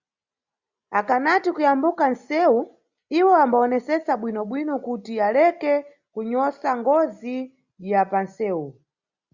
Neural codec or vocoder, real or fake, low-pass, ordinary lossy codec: none; real; 7.2 kHz; Opus, 64 kbps